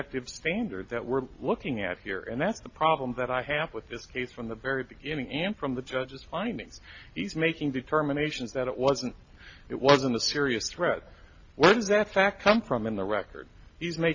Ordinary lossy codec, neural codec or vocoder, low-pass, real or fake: AAC, 48 kbps; none; 7.2 kHz; real